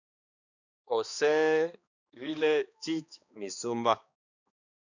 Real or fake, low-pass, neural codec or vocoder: fake; 7.2 kHz; codec, 16 kHz, 2 kbps, X-Codec, HuBERT features, trained on balanced general audio